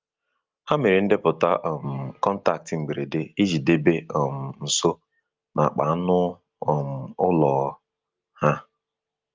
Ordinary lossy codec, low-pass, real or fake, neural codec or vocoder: Opus, 24 kbps; 7.2 kHz; real; none